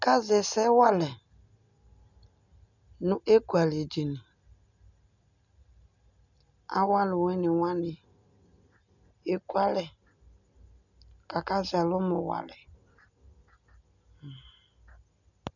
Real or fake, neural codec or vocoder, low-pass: real; none; 7.2 kHz